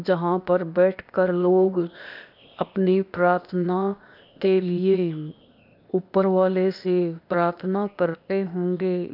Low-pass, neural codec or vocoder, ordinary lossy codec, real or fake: 5.4 kHz; codec, 16 kHz, 0.8 kbps, ZipCodec; none; fake